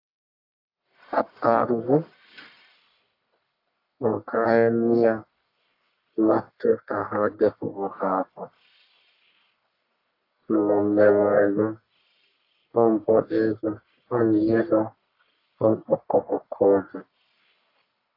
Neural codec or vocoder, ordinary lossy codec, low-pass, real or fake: codec, 44.1 kHz, 1.7 kbps, Pupu-Codec; AAC, 32 kbps; 5.4 kHz; fake